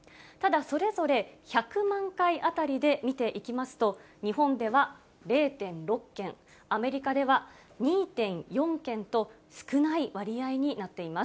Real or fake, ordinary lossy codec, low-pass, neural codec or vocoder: real; none; none; none